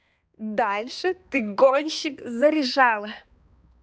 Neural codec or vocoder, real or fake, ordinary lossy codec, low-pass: codec, 16 kHz, 2 kbps, X-Codec, HuBERT features, trained on balanced general audio; fake; none; none